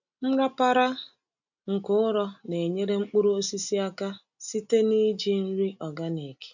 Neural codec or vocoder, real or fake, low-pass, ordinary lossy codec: none; real; 7.2 kHz; none